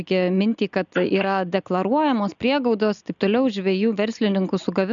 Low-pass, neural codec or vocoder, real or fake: 7.2 kHz; none; real